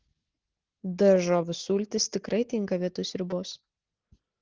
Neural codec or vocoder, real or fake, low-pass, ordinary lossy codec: none; real; 7.2 kHz; Opus, 16 kbps